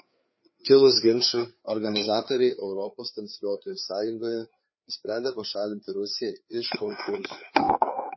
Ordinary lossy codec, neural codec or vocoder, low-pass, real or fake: MP3, 24 kbps; codec, 16 kHz, 4 kbps, FreqCodec, larger model; 7.2 kHz; fake